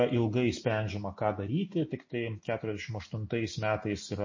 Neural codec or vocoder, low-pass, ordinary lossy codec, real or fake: none; 7.2 kHz; MP3, 32 kbps; real